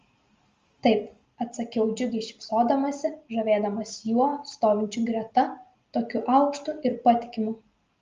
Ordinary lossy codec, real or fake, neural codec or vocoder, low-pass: Opus, 32 kbps; real; none; 7.2 kHz